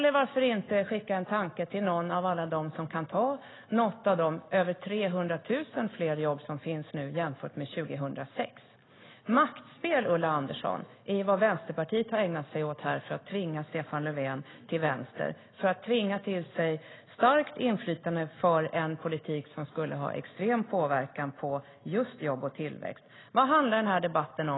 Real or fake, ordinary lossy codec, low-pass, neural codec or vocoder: real; AAC, 16 kbps; 7.2 kHz; none